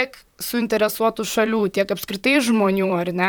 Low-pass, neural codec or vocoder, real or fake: 19.8 kHz; vocoder, 44.1 kHz, 128 mel bands, Pupu-Vocoder; fake